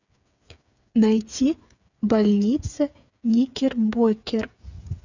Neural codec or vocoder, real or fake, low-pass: codec, 16 kHz, 8 kbps, FreqCodec, smaller model; fake; 7.2 kHz